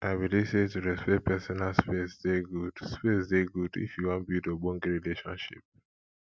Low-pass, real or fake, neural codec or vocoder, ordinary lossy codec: none; real; none; none